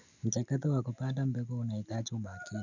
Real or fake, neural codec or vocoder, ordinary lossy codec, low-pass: real; none; none; 7.2 kHz